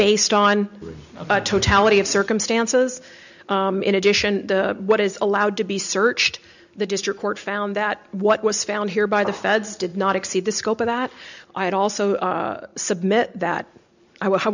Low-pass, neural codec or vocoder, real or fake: 7.2 kHz; none; real